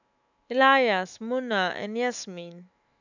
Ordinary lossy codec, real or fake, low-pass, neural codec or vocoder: none; fake; 7.2 kHz; autoencoder, 48 kHz, 128 numbers a frame, DAC-VAE, trained on Japanese speech